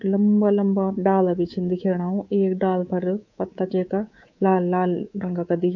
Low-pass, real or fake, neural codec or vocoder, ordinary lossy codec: 7.2 kHz; fake; codec, 24 kHz, 3.1 kbps, DualCodec; AAC, 48 kbps